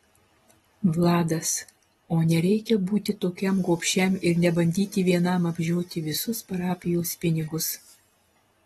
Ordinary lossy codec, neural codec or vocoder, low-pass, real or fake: AAC, 32 kbps; none; 19.8 kHz; real